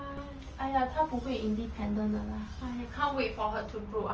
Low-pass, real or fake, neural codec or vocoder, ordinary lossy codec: 7.2 kHz; real; none; Opus, 24 kbps